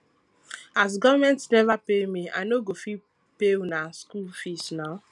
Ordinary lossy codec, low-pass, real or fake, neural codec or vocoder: none; none; real; none